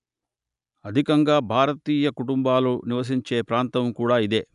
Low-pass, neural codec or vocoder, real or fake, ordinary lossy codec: 10.8 kHz; none; real; none